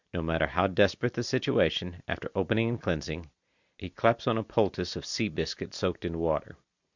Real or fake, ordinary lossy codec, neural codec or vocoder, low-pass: real; Opus, 64 kbps; none; 7.2 kHz